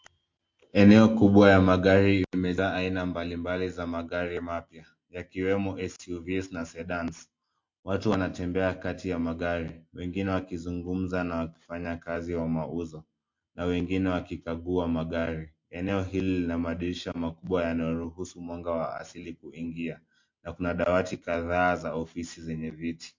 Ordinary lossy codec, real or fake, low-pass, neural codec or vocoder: MP3, 48 kbps; real; 7.2 kHz; none